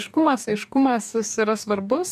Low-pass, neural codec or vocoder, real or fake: 14.4 kHz; codec, 44.1 kHz, 2.6 kbps, DAC; fake